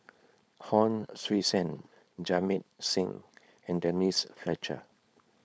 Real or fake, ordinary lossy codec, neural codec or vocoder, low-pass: fake; none; codec, 16 kHz, 4.8 kbps, FACodec; none